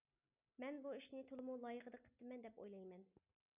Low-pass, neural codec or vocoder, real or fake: 3.6 kHz; none; real